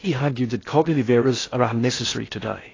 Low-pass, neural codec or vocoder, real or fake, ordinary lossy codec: 7.2 kHz; codec, 16 kHz in and 24 kHz out, 0.6 kbps, FocalCodec, streaming, 2048 codes; fake; AAC, 32 kbps